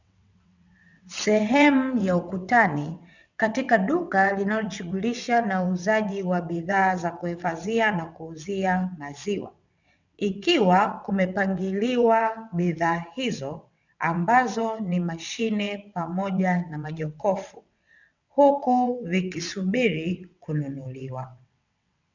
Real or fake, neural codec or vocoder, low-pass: fake; vocoder, 22.05 kHz, 80 mel bands, WaveNeXt; 7.2 kHz